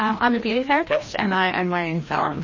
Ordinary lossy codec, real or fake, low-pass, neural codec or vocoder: MP3, 32 kbps; fake; 7.2 kHz; codec, 16 kHz, 1 kbps, FreqCodec, larger model